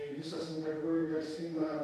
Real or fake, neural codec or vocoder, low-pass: fake; autoencoder, 48 kHz, 32 numbers a frame, DAC-VAE, trained on Japanese speech; 14.4 kHz